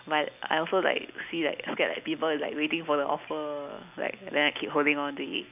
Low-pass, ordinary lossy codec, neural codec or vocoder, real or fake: 3.6 kHz; none; none; real